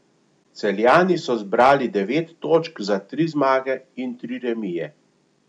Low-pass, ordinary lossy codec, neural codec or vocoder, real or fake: 9.9 kHz; none; none; real